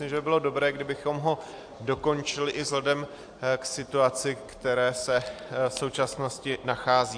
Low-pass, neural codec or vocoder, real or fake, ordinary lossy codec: 9.9 kHz; none; real; AAC, 64 kbps